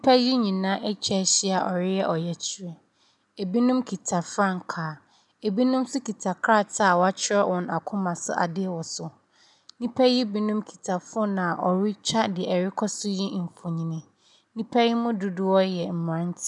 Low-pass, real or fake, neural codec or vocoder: 10.8 kHz; real; none